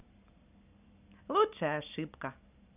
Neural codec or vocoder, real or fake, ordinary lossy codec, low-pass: none; real; none; 3.6 kHz